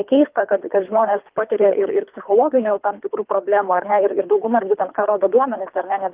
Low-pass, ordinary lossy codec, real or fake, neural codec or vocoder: 3.6 kHz; Opus, 32 kbps; fake; codec, 24 kHz, 3 kbps, HILCodec